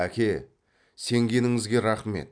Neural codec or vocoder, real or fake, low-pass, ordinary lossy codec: none; real; 9.9 kHz; none